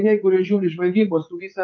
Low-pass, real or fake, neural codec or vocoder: 7.2 kHz; fake; vocoder, 44.1 kHz, 80 mel bands, Vocos